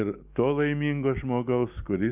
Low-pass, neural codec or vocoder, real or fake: 3.6 kHz; none; real